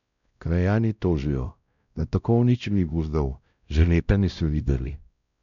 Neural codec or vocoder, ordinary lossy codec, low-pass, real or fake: codec, 16 kHz, 0.5 kbps, X-Codec, WavLM features, trained on Multilingual LibriSpeech; MP3, 96 kbps; 7.2 kHz; fake